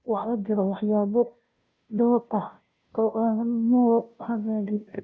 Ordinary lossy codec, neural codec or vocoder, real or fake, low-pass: none; codec, 16 kHz, 0.5 kbps, FunCodec, trained on Chinese and English, 25 frames a second; fake; none